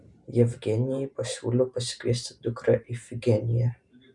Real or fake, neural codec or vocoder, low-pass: fake; vocoder, 44.1 kHz, 128 mel bands every 512 samples, BigVGAN v2; 10.8 kHz